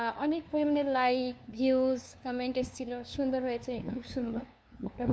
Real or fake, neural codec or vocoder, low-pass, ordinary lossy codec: fake; codec, 16 kHz, 2 kbps, FunCodec, trained on LibriTTS, 25 frames a second; none; none